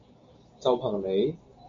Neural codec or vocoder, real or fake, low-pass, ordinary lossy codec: none; real; 7.2 kHz; MP3, 48 kbps